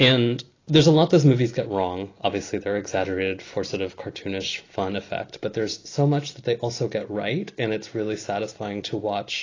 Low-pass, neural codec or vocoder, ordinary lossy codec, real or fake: 7.2 kHz; none; AAC, 32 kbps; real